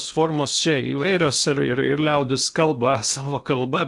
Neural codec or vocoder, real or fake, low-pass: codec, 16 kHz in and 24 kHz out, 0.8 kbps, FocalCodec, streaming, 65536 codes; fake; 10.8 kHz